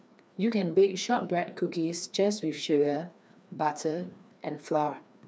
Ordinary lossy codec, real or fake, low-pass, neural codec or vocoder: none; fake; none; codec, 16 kHz, 2 kbps, FreqCodec, larger model